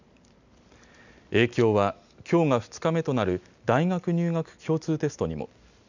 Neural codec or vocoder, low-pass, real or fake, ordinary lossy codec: none; 7.2 kHz; real; none